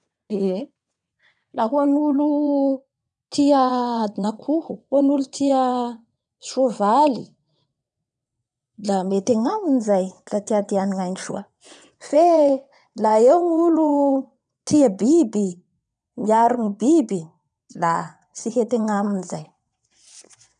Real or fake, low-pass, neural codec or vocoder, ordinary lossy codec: fake; 9.9 kHz; vocoder, 22.05 kHz, 80 mel bands, WaveNeXt; none